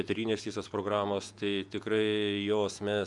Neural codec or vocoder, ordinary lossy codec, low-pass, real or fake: vocoder, 48 kHz, 128 mel bands, Vocos; MP3, 96 kbps; 10.8 kHz; fake